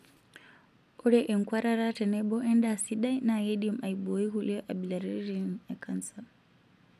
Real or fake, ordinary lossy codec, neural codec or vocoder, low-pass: real; none; none; 14.4 kHz